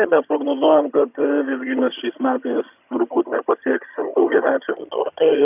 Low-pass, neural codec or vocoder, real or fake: 3.6 kHz; vocoder, 22.05 kHz, 80 mel bands, HiFi-GAN; fake